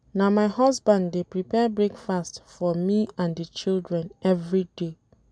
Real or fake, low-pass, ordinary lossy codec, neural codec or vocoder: real; 9.9 kHz; none; none